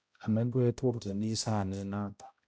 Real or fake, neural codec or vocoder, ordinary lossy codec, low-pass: fake; codec, 16 kHz, 0.5 kbps, X-Codec, HuBERT features, trained on balanced general audio; none; none